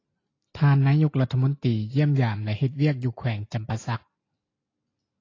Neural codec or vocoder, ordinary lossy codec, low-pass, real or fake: none; AAC, 32 kbps; 7.2 kHz; real